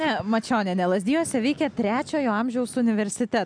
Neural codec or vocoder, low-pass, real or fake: none; 9.9 kHz; real